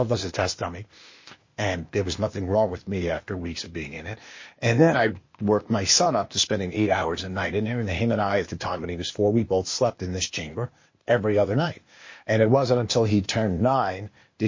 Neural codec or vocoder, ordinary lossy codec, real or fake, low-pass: codec, 16 kHz, 0.8 kbps, ZipCodec; MP3, 32 kbps; fake; 7.2 kHz